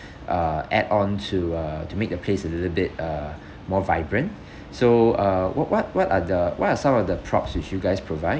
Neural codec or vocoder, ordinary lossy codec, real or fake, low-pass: none; none; real; none